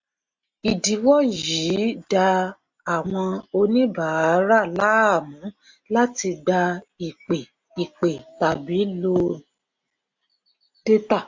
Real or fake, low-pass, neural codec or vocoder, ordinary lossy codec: real; 7.2 kHz; none; MP3, 48 kbps